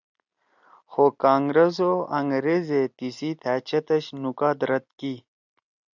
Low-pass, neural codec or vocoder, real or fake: 7.2 kHz; none; real